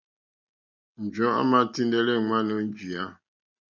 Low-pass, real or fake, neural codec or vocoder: 7.2 kHz; real; none